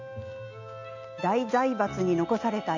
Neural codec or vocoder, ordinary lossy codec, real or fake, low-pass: none; none; real; 7.2 kHz